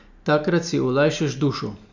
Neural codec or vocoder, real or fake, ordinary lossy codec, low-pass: none; real; none; 7.2 kHz